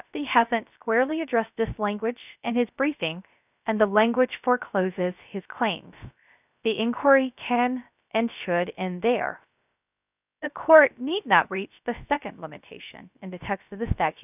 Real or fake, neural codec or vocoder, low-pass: fake; codec, 16 kHz, 0.3 kbps, FocalCodec; 3.6 kHz